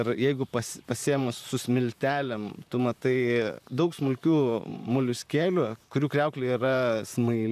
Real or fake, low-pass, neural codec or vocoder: fake; 14.4 kHz; vocoder, 44.1 kHz, 128 mel bands, Pupu-Vocoder